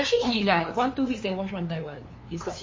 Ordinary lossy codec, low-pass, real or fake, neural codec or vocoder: MP3, 32 kbps; 7.2 kHz; fake; codec, 16 kHz, 2 kbps, FunCodec, trained on LibriTTS, 25 frames a second